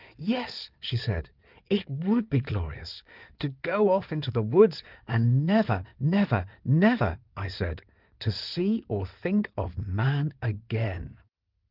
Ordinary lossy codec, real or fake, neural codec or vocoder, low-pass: Opus, 32 kbps; fake; codec, 16 kHz in and 24 kHz out, 2.2 kbps, FireRedTTS-2 codec; 5.4 kHz